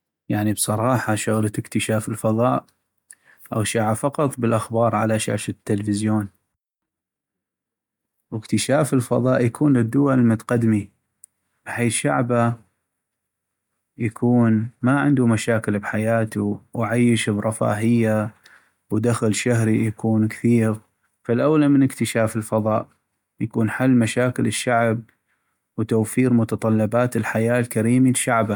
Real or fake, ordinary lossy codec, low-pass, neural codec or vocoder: real; MP3, 96 kbps; 19.8 kHz; none